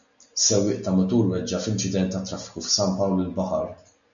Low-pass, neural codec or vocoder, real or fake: 7.2 kHz; none; real